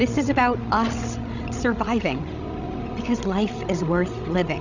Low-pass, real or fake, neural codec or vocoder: 7.2 kHz; fake; codec, 16 kHz, 16 kbps, FreqCodec, larger model